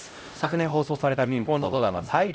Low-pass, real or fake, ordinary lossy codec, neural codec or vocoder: none; fake; none; codec, 16 kHz, 0.5 kbps, X-Codec, HuBERT features, trained on LibriSpeech